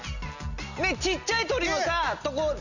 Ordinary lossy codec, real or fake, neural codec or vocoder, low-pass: none; real; none; 7.2 kHz